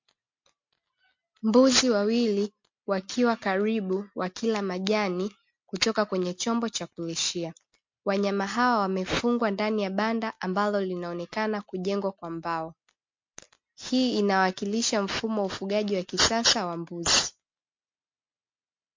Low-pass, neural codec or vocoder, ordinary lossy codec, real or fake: 7.2 kHz; none; MP3, 48 kbps; real